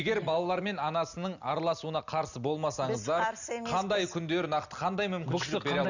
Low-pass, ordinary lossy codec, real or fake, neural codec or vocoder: 7.2 kHz; none; real; none